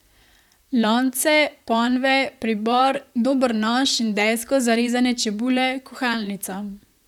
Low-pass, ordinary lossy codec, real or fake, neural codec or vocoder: 19.8 kHz; none; fake; vocoder, 44.1 kHz, 128 mel bands, Pupu-Vocoder